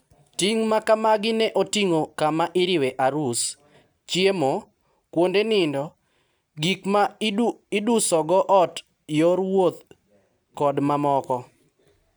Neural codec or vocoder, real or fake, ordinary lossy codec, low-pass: none; real; none; none